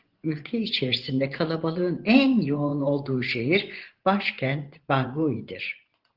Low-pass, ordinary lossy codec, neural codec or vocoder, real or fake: 5.4 kHz; Opus, 16 kbps; vocoder, 24 kHz, 100 mel bands, Vocos; fake